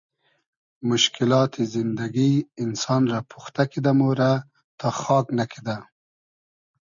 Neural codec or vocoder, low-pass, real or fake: none; 7.2 kHz; real